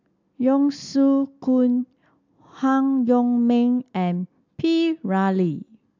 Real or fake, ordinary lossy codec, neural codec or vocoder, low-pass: real; AAC, 48 kbps; none; 7.2 kHz